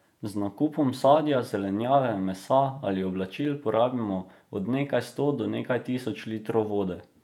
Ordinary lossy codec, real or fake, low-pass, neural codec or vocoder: none; fake; 19.8 kHz; vocoder, 48 kHz, 128 mel bands, Vocos